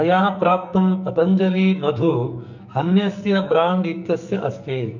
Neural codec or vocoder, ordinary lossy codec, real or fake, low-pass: codec, 44.1 kHz, 2.6 kbps, SNAC; none; fake; 7.2 kHz